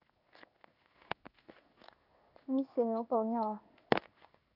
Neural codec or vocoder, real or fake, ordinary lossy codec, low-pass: codec, 16 kHz in and 24 kHz out, 1 kbps, XY-Tokenizer; fake; none; 5.4 kHz